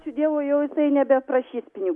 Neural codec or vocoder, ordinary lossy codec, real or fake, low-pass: none; Opus, 64 kbps; real; 10.8 kHz